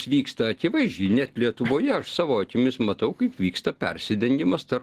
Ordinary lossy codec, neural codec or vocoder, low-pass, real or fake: Opus, 16 kbps; none; 14.4 kHz; real